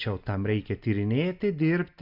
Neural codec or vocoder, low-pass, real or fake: none; 5.4 kHz; real